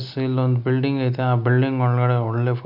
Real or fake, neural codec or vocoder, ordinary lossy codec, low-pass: real; none; none; 5.4 kHz